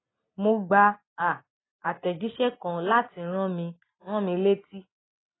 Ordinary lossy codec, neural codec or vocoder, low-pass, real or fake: AAC, 16 kbps; none; 7.2 kHz; real